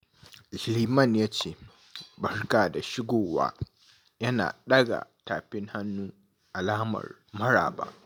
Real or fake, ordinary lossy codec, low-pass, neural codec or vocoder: real; none; none; none